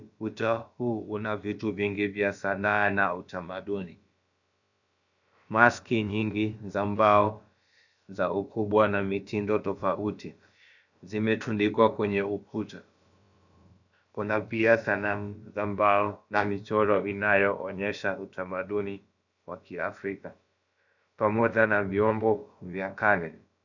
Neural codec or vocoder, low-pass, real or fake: codec, 16 kHz, about 1 kbps, DyCAST, with the encoder's durations; 7.2 kHz; fake